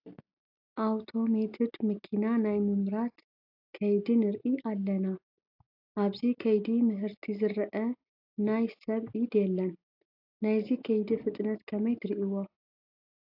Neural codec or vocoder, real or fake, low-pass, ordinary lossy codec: none; real; 5.4 kHz; AAC, 48 kbps